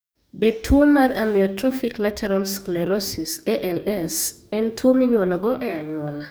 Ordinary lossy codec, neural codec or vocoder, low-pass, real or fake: none; codec, 44.1 kHz, 2.6 kbps, DAC; none; fake